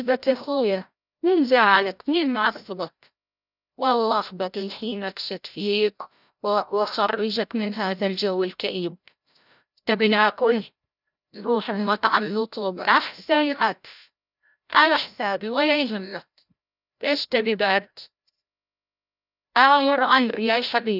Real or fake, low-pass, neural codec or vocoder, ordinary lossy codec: fake; 5.4 kHz; codec, 16 kHz, 0.5 kbps, FreqCodec, larger model; none